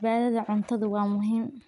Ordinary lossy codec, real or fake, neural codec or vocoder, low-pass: MP3, 96 kbps; real; none; 10.8 kHz